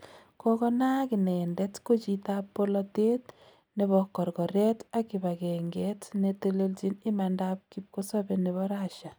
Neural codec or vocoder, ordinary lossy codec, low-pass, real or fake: none; none; none; real